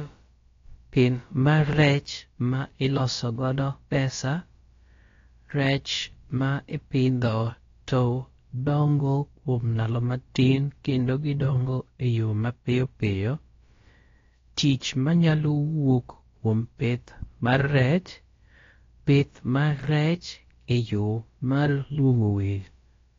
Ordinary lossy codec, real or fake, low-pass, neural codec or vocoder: AAC, 32 kbps; fake; 7.2 kHz; codec, 16 kHz, about 1 kbps, DyCAST, with the encoder's durations